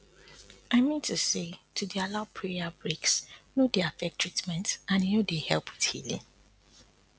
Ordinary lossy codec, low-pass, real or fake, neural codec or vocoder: none; none; real; none